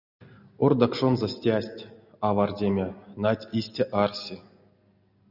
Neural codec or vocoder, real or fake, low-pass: none; real; 5.4 kHz